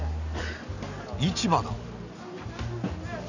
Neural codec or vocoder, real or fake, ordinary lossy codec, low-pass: none; real; none; 7.2 kHz